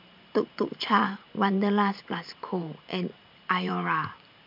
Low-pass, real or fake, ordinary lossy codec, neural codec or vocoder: 5.4 kHz; fake; MP3, 48 kbps; vocoder, 44.1 kHz, 128 mel bands every 512 samples, BigVGAN v2